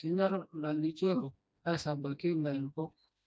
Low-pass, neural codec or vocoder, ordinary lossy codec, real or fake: none; codec, 16 kHz, 1 kbps, FreqCodec, smaller model; none; fake